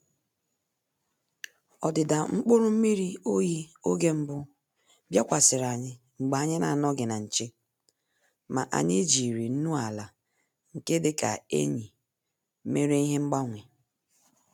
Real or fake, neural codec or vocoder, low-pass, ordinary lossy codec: real; none; 19.8 kHz; none